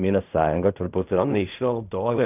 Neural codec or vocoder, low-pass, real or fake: codec, 16 kHz in and 24 kHz out, 0.4 kbps, LongCat-Audio-Codec, fine tuned four codebook decoder; 3.6 kHz; fake